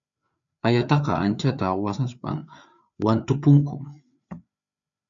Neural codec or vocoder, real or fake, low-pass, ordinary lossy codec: codec, 16 kHz, 4 kbps, FreqCodec, larger model; fake; 7.2 kHz; AAC, 64 kbps